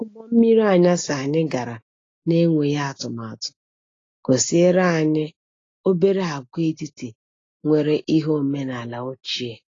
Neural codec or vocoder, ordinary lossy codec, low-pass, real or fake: none; AAC, 32 kbps; 7.2 kHz; real